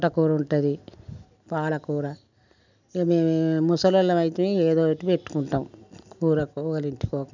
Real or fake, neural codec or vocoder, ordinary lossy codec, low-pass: real; none; none; 7.2 kHz